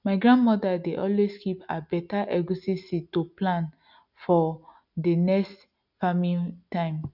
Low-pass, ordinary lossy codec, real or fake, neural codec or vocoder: 5.4 kHz; none; real; none